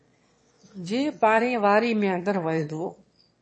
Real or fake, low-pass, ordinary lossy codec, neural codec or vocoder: fake; 9.9 kHz; MP3, 32 kbps; autoencoder, 22.05 kHz, a latent of 192 numbers a frame, VITS, trained on one speaker